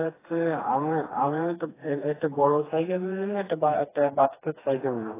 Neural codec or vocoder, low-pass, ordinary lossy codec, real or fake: codec, 16 kHz, 2 kbps, FreqCodec, smaller model; 3.6 kHz; AAC, 16 kbps; fake